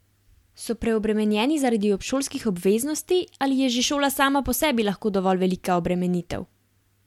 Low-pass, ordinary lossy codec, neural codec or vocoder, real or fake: 19.8 kHz; MP3, 96 kbps; none; real